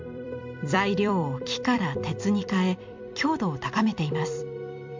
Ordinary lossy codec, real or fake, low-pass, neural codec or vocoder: MP3, 64 kbps; fake; 7.2 kHz; vocoder, 44.1 kHz, 128 mel bands every 256 samples, BigVGAN v2